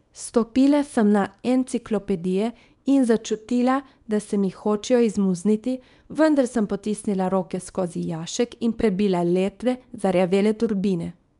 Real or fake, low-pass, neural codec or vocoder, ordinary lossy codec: fake; 10.8 kHz; codec, 24 kHz, 0.9 kbps, WavTokenizer, small release; none